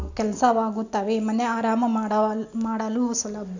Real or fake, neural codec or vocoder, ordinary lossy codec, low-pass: real; none; none; 7.2 kHz